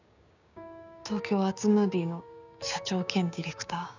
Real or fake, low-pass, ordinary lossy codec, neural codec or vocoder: fake; 7.2 kHz; none; codec, 16 kHz, 6 kbps, DAC